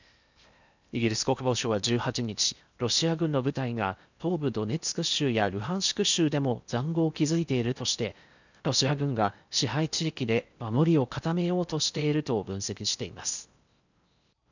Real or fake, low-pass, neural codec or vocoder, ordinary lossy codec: fake; 7.2 kHz; codec, 16 kHz in and 24 kHz out, 0.8 kbps, FocalCodec, streaming, 65536 codes; none